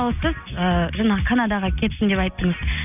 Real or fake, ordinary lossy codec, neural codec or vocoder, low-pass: real; none; none; 3.6 kHz